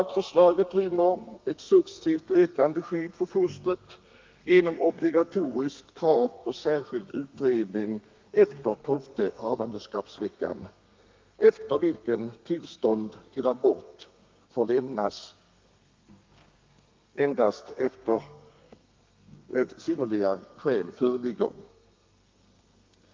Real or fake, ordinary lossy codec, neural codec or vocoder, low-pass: fake; Opus, 24 kbps; codec, 32 kHz, 1.9 kbps, SNAC; 7.2 kHz